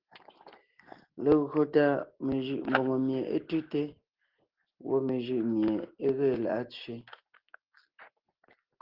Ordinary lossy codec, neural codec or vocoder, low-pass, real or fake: Opus, 16 kbps; none; 5.4 kHz; real